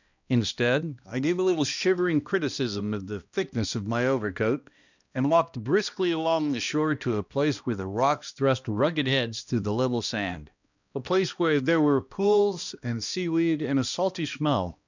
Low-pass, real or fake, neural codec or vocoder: 7.2 kHz; fake; codec, 16 kHz, 1 kbps, X-Codec, HuBERT features, trained on balanced general audio